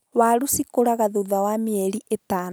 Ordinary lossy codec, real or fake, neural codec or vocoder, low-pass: none; real; none; none